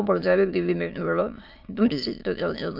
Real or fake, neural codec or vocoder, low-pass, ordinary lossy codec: fake; autoencoder, 22.05 kHz, a latent of 192 numbers a frame, VITS, trained on many speakers; 5.4 kHz; MP3, 48 kbps